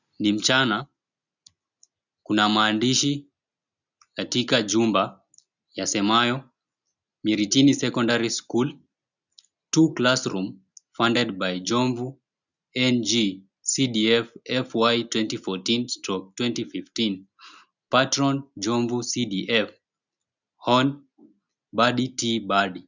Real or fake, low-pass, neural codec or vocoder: real; 7.2 kHz; none